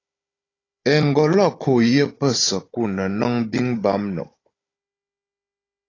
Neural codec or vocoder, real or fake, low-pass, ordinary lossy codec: codec, 16 kHz, 16 kbps, FunCodec, trained on Chinese and English, 50 frames a second; fake; 7.2 kHz; AAC, 32 kbps